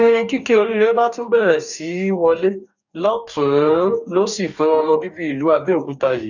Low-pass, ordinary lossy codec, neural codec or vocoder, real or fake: 7.2 kHz; none; codec, 44.1 kHz, 2.6 kbps, DAC; fake